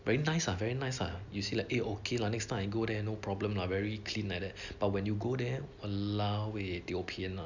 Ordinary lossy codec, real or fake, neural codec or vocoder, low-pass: none; real; none; 7.2 kHz